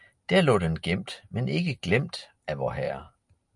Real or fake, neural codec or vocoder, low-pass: real; none; 10.8 kHz